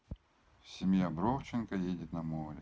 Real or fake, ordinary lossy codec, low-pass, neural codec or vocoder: real; none; none; none